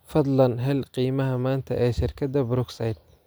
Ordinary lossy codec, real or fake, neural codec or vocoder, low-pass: none; real; none; none